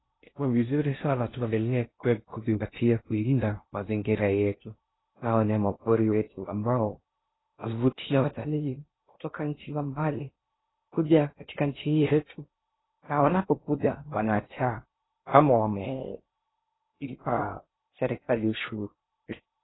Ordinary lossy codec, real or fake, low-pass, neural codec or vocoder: AAC, 16 kbps; fake; 7.2 kHz; codec, 16 kHz in and 24 kHz out, 0.6 kbps, FocalCodec, streaming, 2048 codes